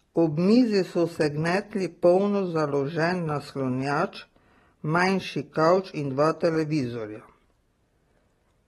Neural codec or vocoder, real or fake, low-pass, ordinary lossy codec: none; real; 19.8 kHz; AAC, 32 kbps